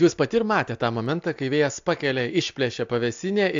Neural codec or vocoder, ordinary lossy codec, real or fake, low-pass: none; AAC, 64 kbps; real; 7.2 kHz